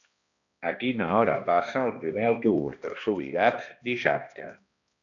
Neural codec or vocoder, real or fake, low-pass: codec, 16 kHz, 1 kbps, X-Codec, HuBERT features, trained on balanced general audio; fake; 7.2 kHz